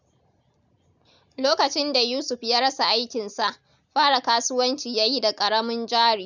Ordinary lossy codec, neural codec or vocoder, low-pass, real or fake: none; none; 7.2 kHz; real